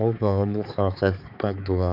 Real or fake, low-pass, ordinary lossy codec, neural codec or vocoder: fake; 5.4 kHz; none; codec, 16 kHz, 4 kbps, X-Codec, HuBERT features, trained on balanced general audio